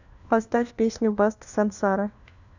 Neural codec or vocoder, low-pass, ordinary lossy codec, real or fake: codec, 16 kHz, 1 kbps, FunCodec, trained on LibriTTS, 50 frames a second; 7.2 kHz; none; fake